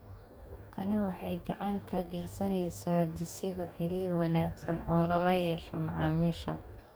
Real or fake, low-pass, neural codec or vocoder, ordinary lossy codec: fake; none; codec, 44.1 kHz, 2.6 kbps, DAC; none